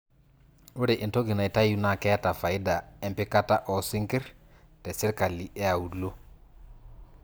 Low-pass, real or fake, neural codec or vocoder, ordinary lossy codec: none; real; none; none